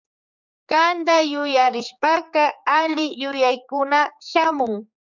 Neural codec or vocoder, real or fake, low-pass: codec, 16 kHz, 4 kbps, X-Codec, HuBERT features, trained on general audio; fake; 7.2 kHz